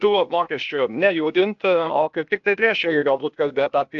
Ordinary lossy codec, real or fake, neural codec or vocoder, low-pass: AAC, 64 kbps; fake; codec, 16 kHz, 0.8 kbps, ZipCodec; 7.2 kHz